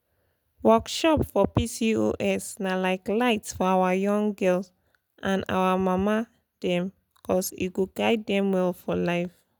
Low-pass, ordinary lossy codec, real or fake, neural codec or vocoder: none; none; real; none